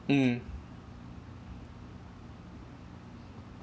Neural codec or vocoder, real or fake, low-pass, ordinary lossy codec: none; real; none; none